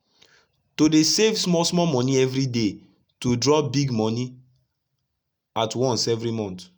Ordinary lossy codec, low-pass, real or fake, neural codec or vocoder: none; none; real; none